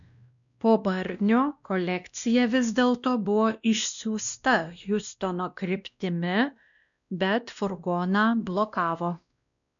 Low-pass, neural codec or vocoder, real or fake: 7.2 kHz; codec, 16 kHz, 1 kbps, X-Codec, WavLM features, trained on Multilingual LibriSpeech; fake